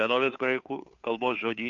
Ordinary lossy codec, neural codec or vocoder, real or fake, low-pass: MP3, 96 kbps; codec, 16 kHz, 2 kbps, FunCodec, trained on Chinese and English, 25 frames a second; fake; 7.2 kHz